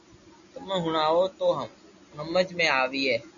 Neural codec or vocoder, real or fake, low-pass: none; real; 7.2 kHz